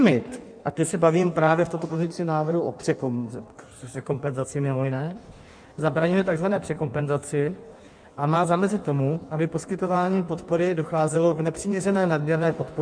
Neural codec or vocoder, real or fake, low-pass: codec, 16 kHz in and 24 kHz out, 1.1 kbps, FireRedTTS-2 codec; fake; 9.9 kHz